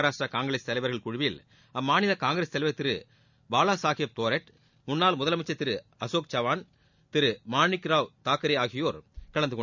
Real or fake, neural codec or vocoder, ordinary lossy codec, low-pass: real; none; none; 7.2 kHz